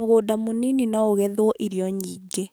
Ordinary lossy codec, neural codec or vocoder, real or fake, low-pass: none; codec, 44.1 kHz, 7.8 kbps, DAC; fake; none